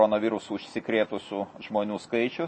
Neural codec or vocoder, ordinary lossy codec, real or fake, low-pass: none; MP3, 32 kbps; real; 10.8 kHz